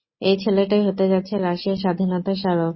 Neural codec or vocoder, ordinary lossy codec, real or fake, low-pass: none; MP3, 24 kbps; real; 7.2 kHz